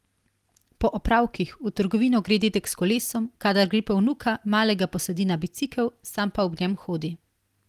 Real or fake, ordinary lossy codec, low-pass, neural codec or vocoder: real; Opus, 24 kbps; 14.4 kHz; none